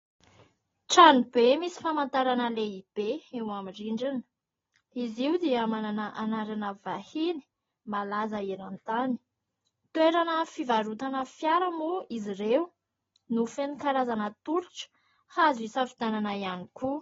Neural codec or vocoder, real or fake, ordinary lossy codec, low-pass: none; real; AAC, 24 kbps; 7.2 kHz